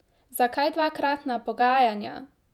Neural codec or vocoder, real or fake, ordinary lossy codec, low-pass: vocoder, 48 kHz, 128 mel bands, Vocos; fake; none; 19.8 kHz